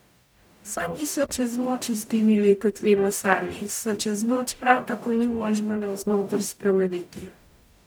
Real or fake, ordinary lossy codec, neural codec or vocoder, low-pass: fake; none; codec, 44.1 kHz, 0.9 kbps, DAC; none